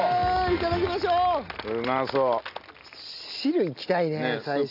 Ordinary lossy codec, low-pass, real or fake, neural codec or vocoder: none; 5.4 kHz; real; none